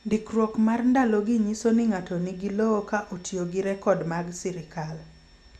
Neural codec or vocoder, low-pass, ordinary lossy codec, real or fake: none; none; none; real